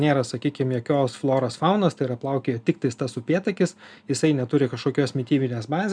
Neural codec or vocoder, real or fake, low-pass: none; real; 9.9 kHz